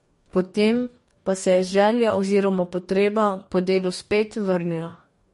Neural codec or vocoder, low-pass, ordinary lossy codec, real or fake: codec, 44.1 kHz, 2.6 kbps, DAC; 14.4 kHz; MP3, 48 kbps; fake